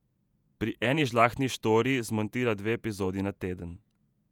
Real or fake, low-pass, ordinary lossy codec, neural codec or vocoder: fake; 19.8 kHz; none; vocoder, 48 kHz, 128 mel bands, Vocos